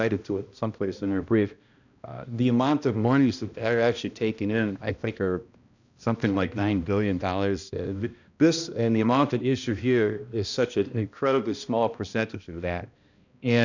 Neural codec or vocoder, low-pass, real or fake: codec, 16 kHz, 1 kbps, X-Codec, HuBERT features, trained on balanced general audio; 7.2 kHz; fake